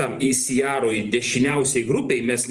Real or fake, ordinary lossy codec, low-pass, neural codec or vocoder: fake; Opus, 24 kbps; 10.8 kHz; vocoder, 44.1 kHz, 128 mel bands every 512 samples, BigVGAN v2